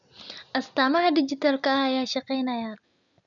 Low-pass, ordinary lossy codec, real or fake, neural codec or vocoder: 7.2 kHz; none; real; none